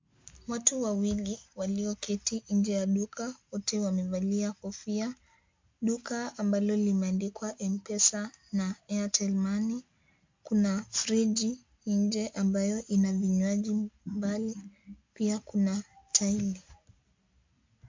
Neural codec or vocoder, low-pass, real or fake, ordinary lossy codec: none; 7.2 kHz; real; MP3, 48 kbps